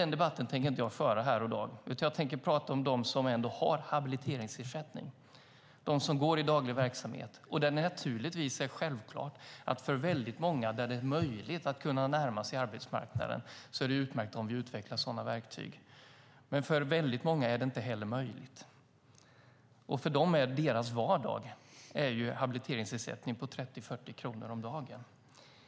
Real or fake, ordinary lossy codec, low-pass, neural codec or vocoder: real; none; none; none